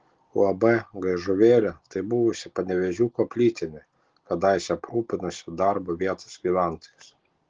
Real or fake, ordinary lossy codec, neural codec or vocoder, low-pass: real; Opus, 16 kbps; none; 7.2 kHz